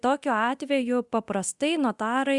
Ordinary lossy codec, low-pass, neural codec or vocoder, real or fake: Opus, 64 kbps; 10.8 kHz; codec, 24 kHz, 0.9 kbps, DualCodec; fake